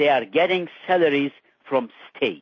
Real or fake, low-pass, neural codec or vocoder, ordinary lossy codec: real; 7.2 kHz; none; MP3, 32 kbps